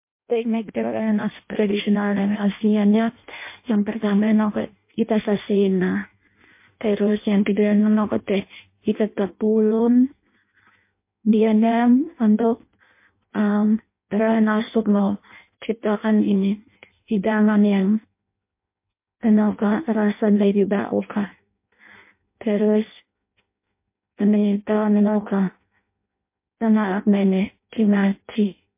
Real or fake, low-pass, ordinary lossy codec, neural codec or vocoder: fake; 3.6 kHz; MP3, 24 kbps; codec, 16 kHz in and 24 kHz out, 0.6 kbps, FireRedTTS-2 codec